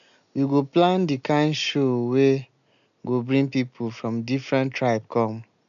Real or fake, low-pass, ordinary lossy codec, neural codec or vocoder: real; 7.2 kHz; MP3, 96 kbps; none